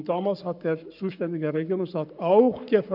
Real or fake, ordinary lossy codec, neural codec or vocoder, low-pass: fake; none; codec, 44.1 kHz, 7.8 kbps, Pupu-Codec; 5.4 kHz